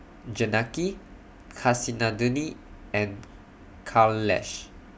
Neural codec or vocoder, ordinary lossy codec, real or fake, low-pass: none; none; real; none